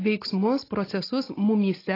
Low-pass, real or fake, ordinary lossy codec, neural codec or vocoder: 5.4 kHz; real; AAC, 24 kbps; none